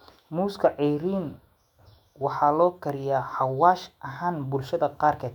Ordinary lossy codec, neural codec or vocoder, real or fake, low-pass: none; autoencoder, 48 kHz, 128 numbers a frame, DAC-VAE, trained on Japanese speech; fake; 19.8 kHz